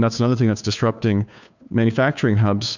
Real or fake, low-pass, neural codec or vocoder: fake; 7.2 kHz; codec, 16 kHz, 2 kbps, FunCodec, trained on Chinese and English, 25 frames a second